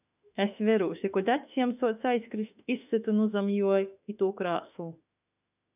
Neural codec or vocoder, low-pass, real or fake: autoencoder, 48 kHz, 32 numbers a frame, DAC-VAE, trained on Japanese speech; 3.6 kHz; fake